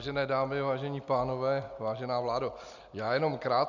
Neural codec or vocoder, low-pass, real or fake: none; 7.2 kHz; real